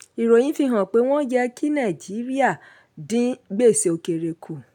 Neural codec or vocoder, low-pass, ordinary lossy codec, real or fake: none; 19.8 kHz; none; real